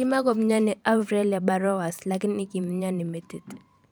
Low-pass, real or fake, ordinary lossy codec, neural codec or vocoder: none; fake; none; vocoder, 44.1 kHz, 128 mel bands every 512 samples, BigVGAN v2